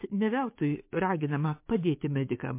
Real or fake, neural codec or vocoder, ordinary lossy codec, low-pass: fake; codec, 44.1 kHz, 7.8 kbps, DAC; MP3, 24 kbps; 3.6 kHz